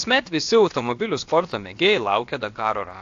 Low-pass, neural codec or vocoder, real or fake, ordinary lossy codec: 7.2 kHz; codec, 16 kHz, about 1 kbps, DyCAST, with the encoder's durations; fake; AAC, 48 kbps